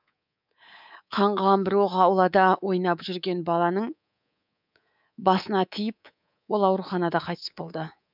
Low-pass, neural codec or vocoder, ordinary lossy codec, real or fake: 5.4 kHz; codec, 24 kHz, 3.1 kbps, DualCodec; none; fake